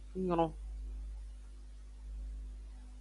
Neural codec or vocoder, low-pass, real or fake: none; 10.8 kHz; real